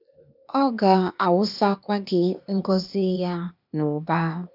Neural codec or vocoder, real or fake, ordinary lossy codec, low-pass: codec, 16 kHz, 0.8 kbps, ZipCodec; fake; none; 5.4 kHz